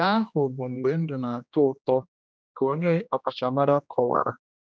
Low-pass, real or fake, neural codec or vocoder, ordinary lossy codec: none; fake; codec, 16 kHz, 1 kbps, X-Codec, HuBERT features, trained on general audio; none